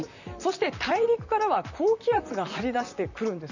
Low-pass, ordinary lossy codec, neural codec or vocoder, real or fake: 7.2 kHz; none; vocoder, 44.1 kHz, 128 mel bands, Pupu-Vocoder; fake